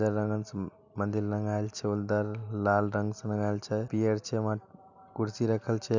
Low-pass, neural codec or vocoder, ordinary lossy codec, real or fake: 7.2 kHz; none; none; real